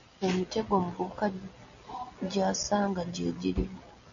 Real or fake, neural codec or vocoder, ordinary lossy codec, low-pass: real; none; MP3, 64 kbps; 7.2 kHz